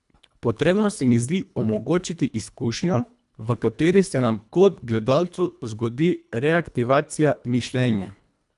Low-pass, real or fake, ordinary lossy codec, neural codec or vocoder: 10.8 kHz; fake; none; codec, 24 kHz, 1.5 kbps, HILCodec